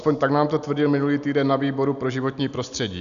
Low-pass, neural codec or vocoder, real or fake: 7.2 kHz; none; real